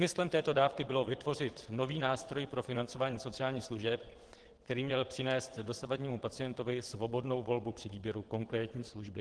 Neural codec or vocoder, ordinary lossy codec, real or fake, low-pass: vocoder, 22.05 kHz, 80 mel bands, WaveNeXt; Opus, 16 kbps; fake; 9.9 kHz